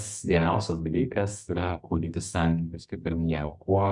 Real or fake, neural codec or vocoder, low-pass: fake; codec, 24 kHz, 0.9 kbps, WavTokenizer, medium music audio release; 10.8 kHz